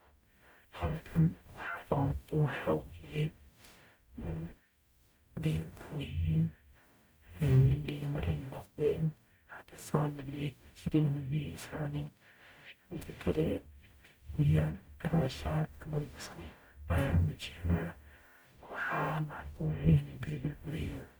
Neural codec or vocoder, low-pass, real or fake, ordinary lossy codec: codec, 44.1 kHz, 0.9 kbps, DAC; none; fake; none